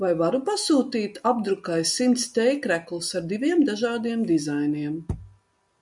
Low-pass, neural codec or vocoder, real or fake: 10.8 kHz; none; real